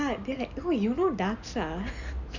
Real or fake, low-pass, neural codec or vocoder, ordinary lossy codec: real; 7.2 kHz; none; none